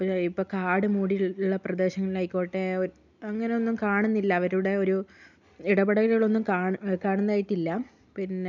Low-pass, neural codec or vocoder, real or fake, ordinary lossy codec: 7.2 kHz; none; real; none